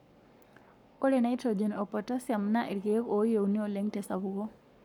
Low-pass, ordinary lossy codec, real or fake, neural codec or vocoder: 19.8 kHz; none; fake; codec, 44.1 kHz, 7.8 kbps, Pupu-Codec